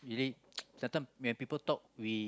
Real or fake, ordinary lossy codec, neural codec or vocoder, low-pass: real; none; none; none